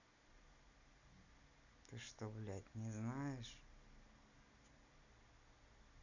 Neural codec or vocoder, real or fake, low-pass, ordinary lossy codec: none; real; 7.2 kHz; none